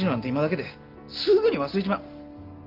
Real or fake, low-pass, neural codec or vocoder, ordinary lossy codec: real; 5.4 kHz; none; Opus, 32 kbps